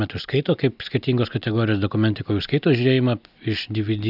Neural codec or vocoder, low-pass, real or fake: none; 5.4 kHz; real